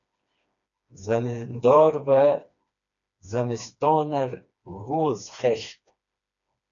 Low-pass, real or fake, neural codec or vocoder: 7.2 kHz; fake; codec, 16 kHz, 2 kbps, FreqCodec, smaller model